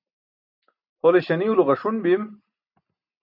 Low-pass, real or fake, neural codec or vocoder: 5.4 kHz; real; none